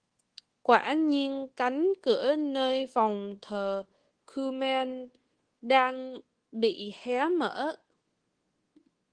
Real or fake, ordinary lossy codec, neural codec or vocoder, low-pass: fake; Opus, 16 kbps; codec, 24 kHz, 1.2 kbps, DualCodec; 9.9 kHz